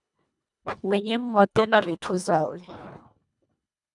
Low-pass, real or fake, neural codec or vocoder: 10.8 kHz; fake; codec, 24 kHz, 1.5 kbps, HILCodec